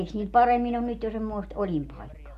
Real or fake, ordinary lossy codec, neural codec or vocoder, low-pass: real; none; none; 14.4 kHz